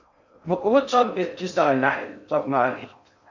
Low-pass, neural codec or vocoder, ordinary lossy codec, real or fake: 7.2 kHz; codec, 16 kHz in and 24 kHz out, 0.6 kbps, FocalCodec, streaming, 4096 codes; MP3, 48 kbps; fake